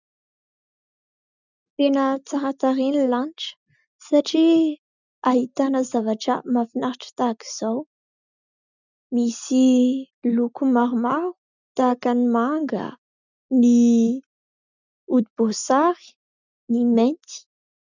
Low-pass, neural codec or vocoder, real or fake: 7.2 kHz; none; real